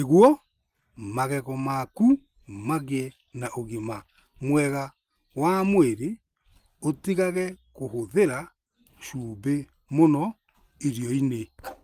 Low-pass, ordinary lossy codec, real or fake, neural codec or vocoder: 14.4 kHz; Opus, 32 kbps; real; none